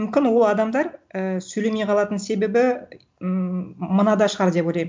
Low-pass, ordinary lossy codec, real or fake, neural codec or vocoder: none; none; real; none